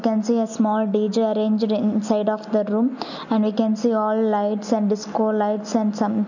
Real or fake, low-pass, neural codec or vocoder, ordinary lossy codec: real; 7.2 kHz; none; none